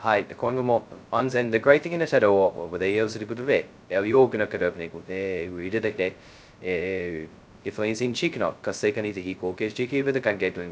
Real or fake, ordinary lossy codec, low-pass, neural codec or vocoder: fake; none; none; codec, 16 kHz, 0.2 kbps, FocalCodec